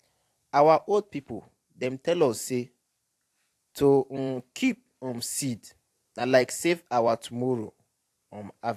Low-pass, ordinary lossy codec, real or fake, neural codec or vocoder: 14.4 kHz; AAC, 64 kbps; fake; vocoder, 44.1 kHz, 128 mel bands every 256 samples, BigVGAN v2